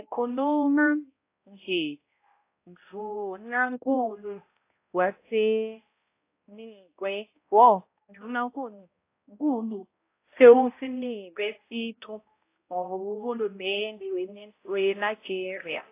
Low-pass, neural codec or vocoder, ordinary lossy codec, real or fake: 3.6 kHz; codec, 16 kHz, 0.5 kbps, X-Codec, HuBERT features, trained on balanced general audio; AAC, 24 kbps; fake